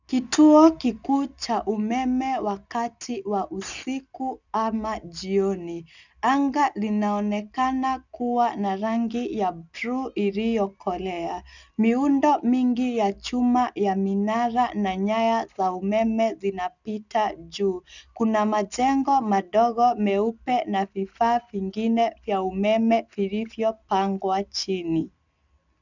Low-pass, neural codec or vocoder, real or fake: 7.2 kHz; none; real